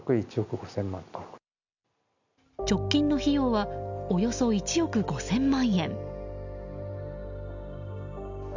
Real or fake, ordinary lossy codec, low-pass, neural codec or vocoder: real; none; 7.2 kHz; none